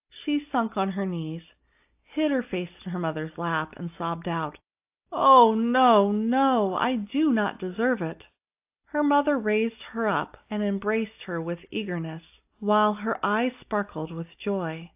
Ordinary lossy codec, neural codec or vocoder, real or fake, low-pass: AAC, 32 kbps; none; real; 3.6 kHz